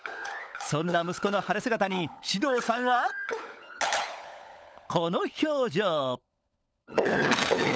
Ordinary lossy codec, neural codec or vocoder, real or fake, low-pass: none; codec, 16 kHz, 16 kbps, FunCodec, trained on LibriTTS, 50 frames a second; fake; none